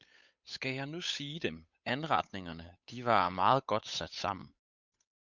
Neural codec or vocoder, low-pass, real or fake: codec, 16 kHz, 8 kbps, FunCodec, trained on Chinese and English, 25 frames a second; 7.2 kHz; fake